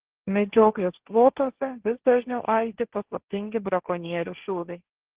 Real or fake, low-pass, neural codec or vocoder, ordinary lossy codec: fake; 3.6 kHz; codec, 16 kHz, 1.1 kbps, Voila-Tokenizer; Opus, 16 kbps